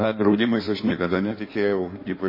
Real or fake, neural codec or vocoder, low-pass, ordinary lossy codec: fake; codec, 16 kHz in and 24 kHz out, 1.1 kbps, FireRedTTS-2 codec; 5.4 kHz; MP3, 24 kbps